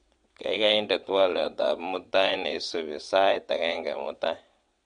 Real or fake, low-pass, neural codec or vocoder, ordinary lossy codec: fake; 9.9 kHz; vocoder, 22.05 kHz, 80 mel bands, WaveNeXt; MP3, 64 kbps